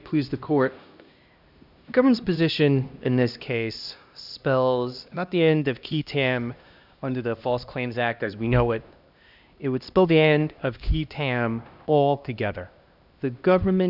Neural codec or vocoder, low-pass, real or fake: codec, 16 kHz, 1 kbps, X-Codec, HuBERT features, trained on LibriSpeech; 5.4 kHz; fake